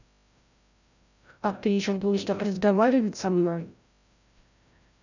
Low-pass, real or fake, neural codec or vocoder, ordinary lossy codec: 7.2 kHz; fake; codec, 16 kHz, 0.5 kbps, FreqCodec, larger model; none